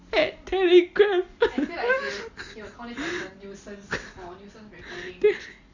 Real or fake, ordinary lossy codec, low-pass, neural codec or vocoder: real; Opus, 64 kbps; 7.2 kHz; none